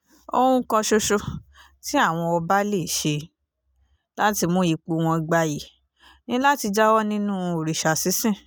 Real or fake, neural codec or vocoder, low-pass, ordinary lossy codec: real; none; none; none